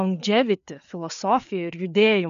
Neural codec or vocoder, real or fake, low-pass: codec, 16 kHz, 4 kbps, FreqCodec, larger model; fake; 7.2 kHz